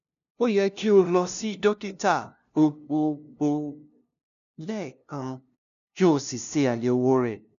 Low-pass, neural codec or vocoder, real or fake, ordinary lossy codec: 7.2 kHz; codec, 16 kHz, 0.5 kbps, FunCodec, trained on LibriTTS, 25 frames a second; fake; none